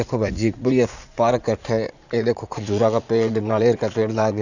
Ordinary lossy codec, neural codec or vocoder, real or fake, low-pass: none; vocoder, 44.1 kHz, 80 mel bands, Vocos; fake; 7.2 kHz